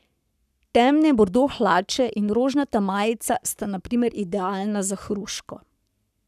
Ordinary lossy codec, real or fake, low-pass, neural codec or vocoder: none; fake; 14.4 kHz; codec, 44.1 kHz, 7.8 kbps, Pupu-Codec